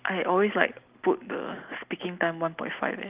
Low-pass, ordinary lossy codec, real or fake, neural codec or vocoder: 3.6 kHz; Opus, 32 kbps; real; none